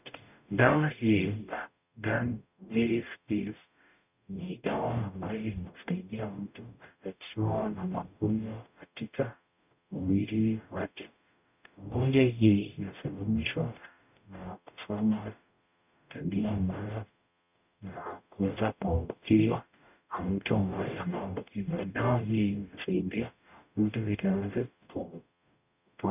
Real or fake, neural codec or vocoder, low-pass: fake; codec, 44.1 kHz, 0.9 kbps, DAC; 3.6 kHz